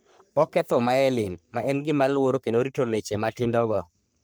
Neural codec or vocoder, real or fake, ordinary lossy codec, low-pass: codec, 44.1 kHz, 3.4 kbps, Pupu-Codec; fake; none; none